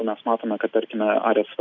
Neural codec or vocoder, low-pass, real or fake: none; 7.2 kHz; real